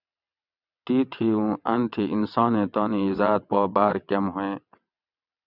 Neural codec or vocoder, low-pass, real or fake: vocoder, 22.05 kHz, 80 mel bands, WaveNeXt; 5.4 kHz; fake